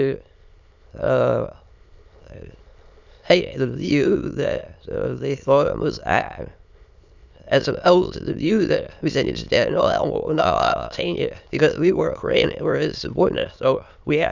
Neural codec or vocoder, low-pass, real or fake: autoencoder, 22.05 kHz, a latent of 192 numbers a frame, VITS, trained on many speakers; 7.2 kHz; fake